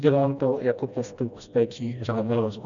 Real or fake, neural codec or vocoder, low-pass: fake; codec, 16 kHz, 1 kbps, FreqCodec, smaller model; 7.2 kHz